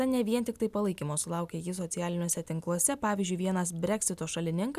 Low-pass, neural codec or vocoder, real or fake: 14.4 kHz; none; real